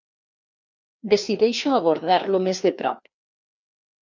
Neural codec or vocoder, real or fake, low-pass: codec, 16 kHz, 2 kbps, FreqCodec, larger model; fake; 7.2 kHz